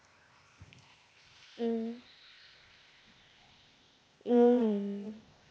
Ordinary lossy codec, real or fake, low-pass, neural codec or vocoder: none; fake; none; codec, 16 kHz, 0.8 kbps, ZipCodec